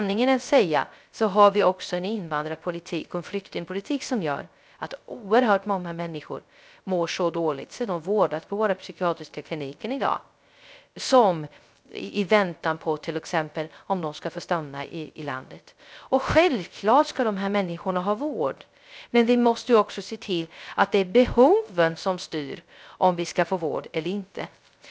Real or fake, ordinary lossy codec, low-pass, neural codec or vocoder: fake; none; none; codec, 16 kHz, 0.3 kbps, FocalCodec